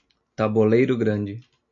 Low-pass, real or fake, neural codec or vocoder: 7.2 kHz; real; none